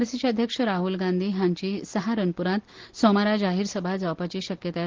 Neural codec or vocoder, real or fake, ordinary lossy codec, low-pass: none; real; Opus, 16 kbps; 7.2 kHz